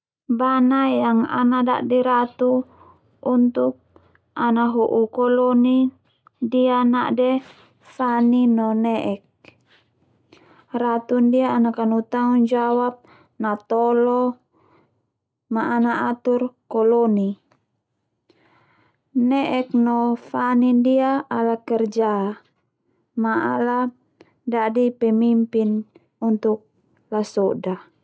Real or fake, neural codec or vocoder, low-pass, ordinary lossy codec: real; none; none; none